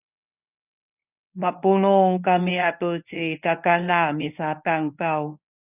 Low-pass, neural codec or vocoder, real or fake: 3.6 kHz; codec, 24 kHz, 0.9 kbps, WavTokenizer, medium speech release version 1; fake